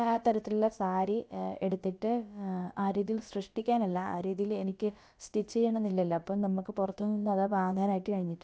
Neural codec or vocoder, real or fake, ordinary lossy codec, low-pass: codec, 16 kHz, about 1 kbps, DyCAST, with the encoder's durations; fake; none; none